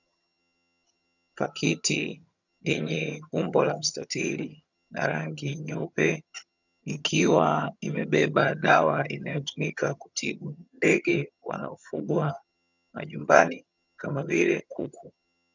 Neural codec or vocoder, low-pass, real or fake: vocoder, 22.05 kHz, 80 mel bands, HiFi-GAN; 7.2 kHz; fake